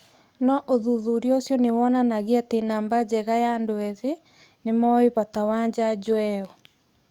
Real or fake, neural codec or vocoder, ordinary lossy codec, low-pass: fake; codec, 44.1 kHz, 7.8 kbps, DAC; Opus, 64 kbps; 19.8 kHz